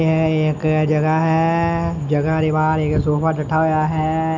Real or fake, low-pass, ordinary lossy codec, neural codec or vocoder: real; 7.2 kHz; none; none